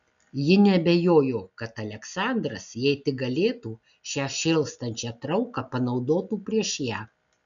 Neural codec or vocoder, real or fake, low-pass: none; real; 7.2 kHz